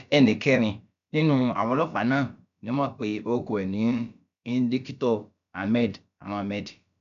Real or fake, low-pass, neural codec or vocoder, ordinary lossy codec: fake; 7.2 kHz; codec, 16 kHz, about 1 kbps, DyCAST, with the encoder's durations; none